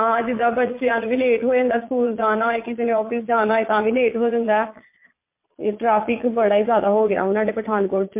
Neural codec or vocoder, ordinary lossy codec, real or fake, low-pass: vocoder, 22.05 kHz, 80 mel bands, Vocos; MP3, 24 kbps; fake; 3.6 kHz